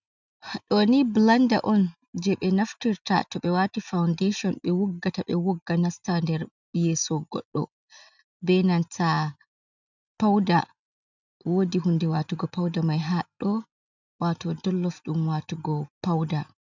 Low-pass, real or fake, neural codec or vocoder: 7.2 kHz; real; none